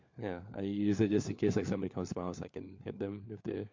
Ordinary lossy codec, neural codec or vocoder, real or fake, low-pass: MP3, 48 kbps; codec, 16 kHz, 4 kbps, FunCodec, trained on LibriTTS, 50 frames a second; fake; 7.2 kHz